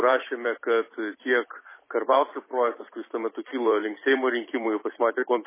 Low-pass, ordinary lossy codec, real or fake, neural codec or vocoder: 3.6 kHz; MP3, 16 kbps; fake; vocoder, 44.1 kHz, 128 mel bands every 256 samples, BigVGAN v2